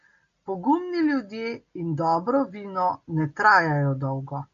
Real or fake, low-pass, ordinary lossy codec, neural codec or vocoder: real; 7.2 kHz; MP3, 48 kbps; none